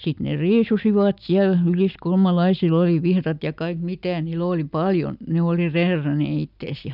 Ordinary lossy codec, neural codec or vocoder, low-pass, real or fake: none; none; 5.4 kHz; real